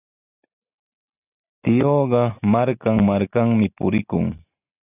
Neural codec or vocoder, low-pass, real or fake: none; 3.6 kHz; real